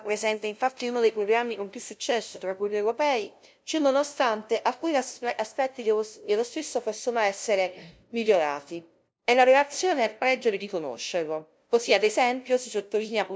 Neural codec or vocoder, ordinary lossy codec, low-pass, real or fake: codec, 16 kHz, 0.5 kbps, FunCodec, trained on LibriTTS, 25 frames a second; none; none; fake